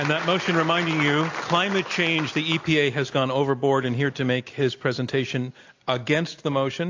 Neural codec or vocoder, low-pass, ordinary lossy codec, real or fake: none; 7.2 kHz; AAC, 48 kbps; real